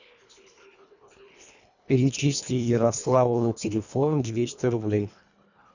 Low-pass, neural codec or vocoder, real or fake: 7.2 kHz; codec, 24 kHz, 1.5 kbps, HILCodec; fake